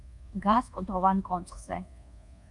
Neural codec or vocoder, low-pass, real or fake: codec, 24 kHz, 1.2 kbps, DualCodec; 10.8 kHz; fake